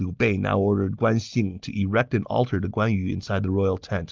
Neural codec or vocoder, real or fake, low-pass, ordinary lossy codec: none; real; 7.2 kHz; Opus, 32 kbps